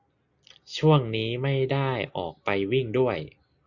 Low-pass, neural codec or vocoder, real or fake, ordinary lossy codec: 7.2 kHz; none; real; Opus, 64 kbps